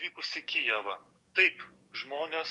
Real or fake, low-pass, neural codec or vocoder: fake; 10.8 kHz; codec, 44.1 kHz, 7.8 kbps, DAC